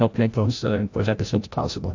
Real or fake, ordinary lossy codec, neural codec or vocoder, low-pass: fake; AAC, 48 kbps; codec, 16 kHz, 0.5 kbps, FreqCodec, larger model; 7.2 kHz